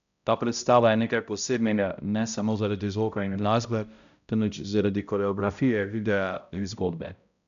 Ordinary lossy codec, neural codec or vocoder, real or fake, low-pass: none; codec, 16 kHz, 0.5 kbps, X-Codec, HuBERT features, trained on balanced general audio; fake; 7.2 kHz